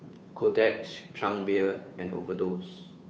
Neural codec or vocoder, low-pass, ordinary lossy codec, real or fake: codec, 16 kHz, 2 kbps, FunCodec, trained on Chinese and English, 25 frames a second; none; none; fake